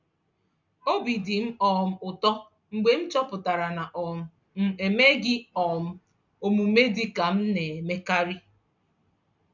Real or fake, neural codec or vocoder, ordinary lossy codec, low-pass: real; none; none; 7.2 kHz